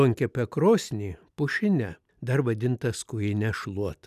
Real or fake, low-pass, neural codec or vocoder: real; 14.4 kHz; none